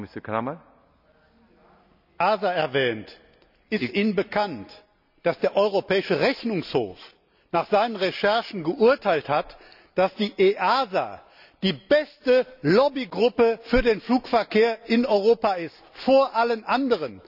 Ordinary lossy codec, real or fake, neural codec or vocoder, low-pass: none; real; none; 5.4 kHz